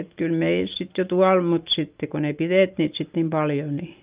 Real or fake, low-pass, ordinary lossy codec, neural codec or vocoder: real; 3.6 kHz; Opus, 24 kbps; none